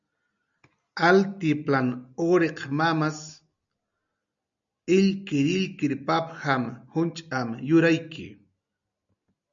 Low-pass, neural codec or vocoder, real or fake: 7.2 kHz; none; real